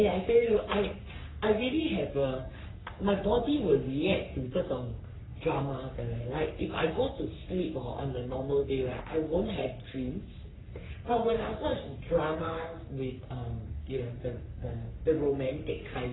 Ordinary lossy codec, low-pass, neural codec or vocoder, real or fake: AAC, 16 kbps; 7.2 kHz; codec, 44.1 kHz, 3.4 kbps, Pupu-Codec; fake